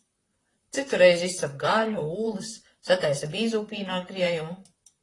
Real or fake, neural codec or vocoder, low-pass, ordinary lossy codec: fake; vocoder, 44.1 kHz, 128 mel bands, Pupu-Vocoder; 10.8 kHz; AAC, 32 kbps